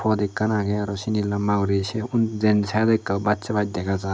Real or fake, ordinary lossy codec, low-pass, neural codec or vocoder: real; none; none; none